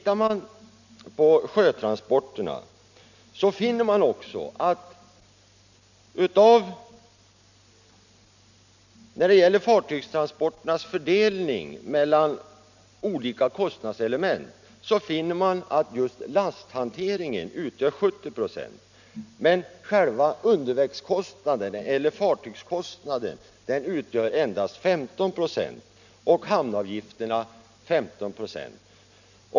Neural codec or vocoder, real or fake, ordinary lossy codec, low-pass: none; real; none; 7.2 kHz